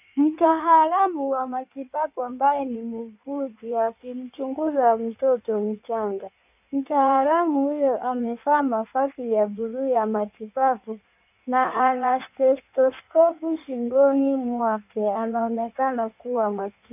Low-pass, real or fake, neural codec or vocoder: 3.6 kHz; fake; codec, 16 kHz in and 24 kHz out, 1.1 kbps, FireRedTTS-2 codec